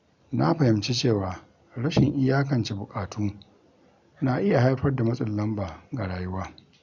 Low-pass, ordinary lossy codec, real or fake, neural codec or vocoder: 7.2 kHz; none; real; none